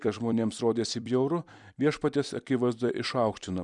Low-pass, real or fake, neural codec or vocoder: 10.8 kHz; fake; vocoder, 44.1 kHz, 128 mel bands every 256 samples, BigVGAN v2